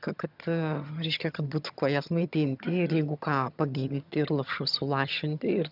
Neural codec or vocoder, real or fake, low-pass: vocoder, 22.05 kHz, 80 mel bands, HiFi-GAN; fake; 5.4 kHz